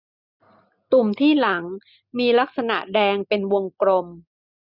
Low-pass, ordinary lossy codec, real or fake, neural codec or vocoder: 5.4 kHz; none; real; none